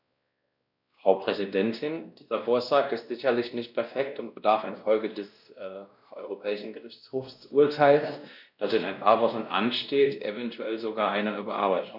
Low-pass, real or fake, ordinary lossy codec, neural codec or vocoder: 5.4 kHz; fake; none; codec, 16 kHz, 1 kbps, X-Codec, WavLM features, trained on Multilingual LibriSpeech